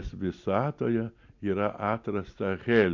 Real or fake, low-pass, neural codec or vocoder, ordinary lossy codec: real; 7.2 kHz; none; MP3, 48 kbps